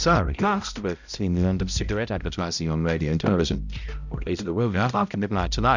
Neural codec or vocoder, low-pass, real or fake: codec, 16 kHz, 0.5 kbps, X-Codec, HuBERT features, trained on balanced general audio; 7.2 kHz; fake